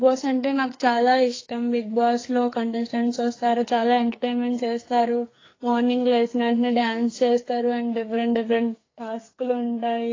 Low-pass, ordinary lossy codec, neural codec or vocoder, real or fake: 7.2 kHz; AAC, 32 kbps; codec, 44.1 kHz, 2.6 kbps, SNAC; fake